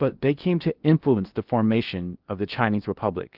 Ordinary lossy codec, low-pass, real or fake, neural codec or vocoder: Opus, 32 kbps; 5.4 kHz; fake; codec, 16 kHz in and 24 kHz out, 0.8 kbps, FocalCodec, streaming, 65536 codes